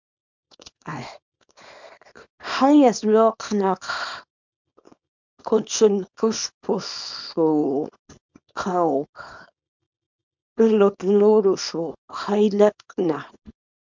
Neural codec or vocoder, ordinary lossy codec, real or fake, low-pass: codec, 24 kHz, 0.9 kbps, WavTokenizer, small release; MP3, 64 kbps; fake; 7.2 kHz